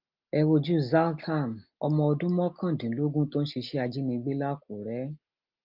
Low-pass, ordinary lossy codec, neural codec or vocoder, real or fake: 5.4 kHz; Opus, 24 kbps; none; real